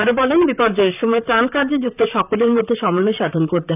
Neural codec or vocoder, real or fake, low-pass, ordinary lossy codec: vocoder, 44.1 kHz, 128 mel bands, Pupu-Vocoder; fake; 3.6 kHz; none